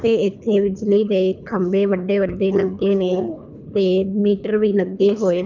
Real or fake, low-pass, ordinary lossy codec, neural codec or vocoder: fake; 7.2 kHz; none; codec, 24 kHz, 3 kbps, HILCodec